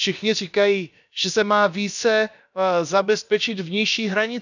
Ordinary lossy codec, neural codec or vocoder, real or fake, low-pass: none; codec, 16 kHz, about 1 kbps, DyCAST, with the encoder's durations; fake; 7.2 kHz